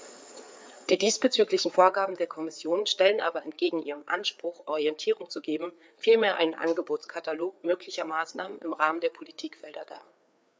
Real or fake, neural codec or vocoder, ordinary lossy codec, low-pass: fake; codec, 16 kHz, 4 kbps, FreqCodec, larger model; none; none